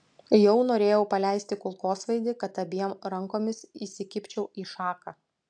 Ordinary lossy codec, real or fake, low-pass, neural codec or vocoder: AAC, 64 kbps; real; 9.9 kHz; none